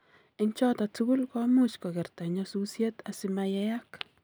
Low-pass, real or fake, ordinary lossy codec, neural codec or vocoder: none; real; none; none